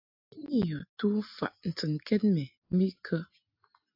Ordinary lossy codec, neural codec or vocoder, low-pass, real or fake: AAC, 48 kbps; none; 5.4 kHz; real